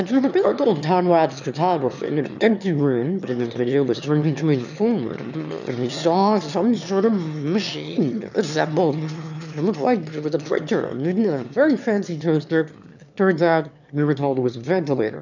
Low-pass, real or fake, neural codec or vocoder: 7.2 kHz; fake; autoencoder, 22.05 kHz, a latent of 192 numbers a frame, VITS, trained on one speaker